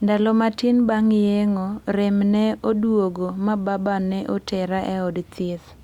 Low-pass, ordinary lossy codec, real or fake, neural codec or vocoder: 19.8 kHz; Opus, 64 kbps; real; none